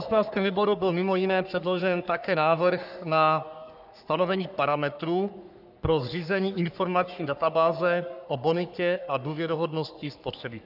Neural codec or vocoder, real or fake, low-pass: codec, 44.1 kHz, 3.4 kbps, Pupu-Codec; fake; 5.4 kHz